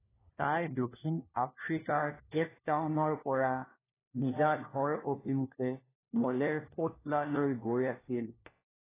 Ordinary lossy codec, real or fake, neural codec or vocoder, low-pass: AAC, 16 kbps; fake; codec, 16 kHz, 1 kbps, FunCodec, trained on LibriTTS, 50 frames a second; 3.6 kHz